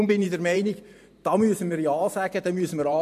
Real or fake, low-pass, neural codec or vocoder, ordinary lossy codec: fake; 14.4 kHz; vocoder, 44.1 kHz, 128 mel bands every 512 samples, BigVGAN v2; MP3, 64 kbps